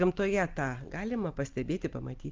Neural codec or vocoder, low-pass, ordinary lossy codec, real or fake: none; 7.2 kHz; Opus, 32 kbps; real